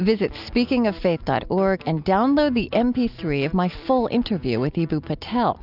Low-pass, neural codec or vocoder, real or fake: 5.4 kHz; none; real